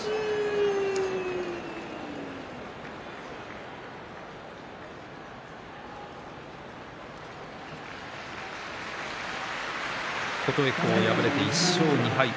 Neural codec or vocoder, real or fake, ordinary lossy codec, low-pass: none; real; none; none